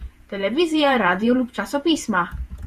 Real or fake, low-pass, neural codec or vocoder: fake; 14.4 kHz; vocoder, 48 kHz, 128 mel bands, Vocos